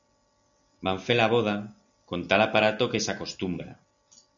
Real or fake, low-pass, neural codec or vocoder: real; 7.2 kHz; none